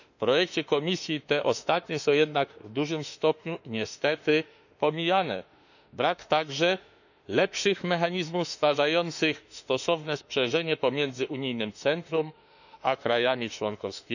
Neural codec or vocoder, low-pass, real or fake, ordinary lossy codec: autoencoder, 48 kHz, 32 numbers a frame, DAC-VAE, trained on Japanese speech; 7.2 kHz; fake; none